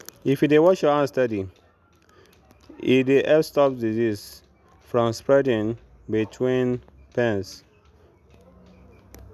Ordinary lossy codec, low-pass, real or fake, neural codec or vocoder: none; 14.4 kHz; real; none